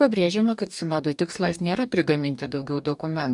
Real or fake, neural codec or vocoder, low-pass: fake; codec, 44.1 kHz, 2.6 kbps, DAC; 10.8 kHz